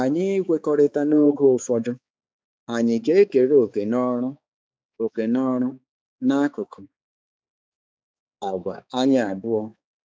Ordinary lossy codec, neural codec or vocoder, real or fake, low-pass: none; codec, 16 kHz, 4 kbps, X-Codec, HuBERT features, trained on balanced general audio; fake; none